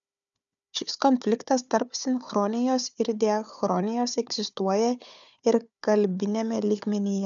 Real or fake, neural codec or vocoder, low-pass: fake; codec, 16 kHz, 4 kbps, FunCodec, trained on Chinese and English, 50 frames a second; 7.2 kHz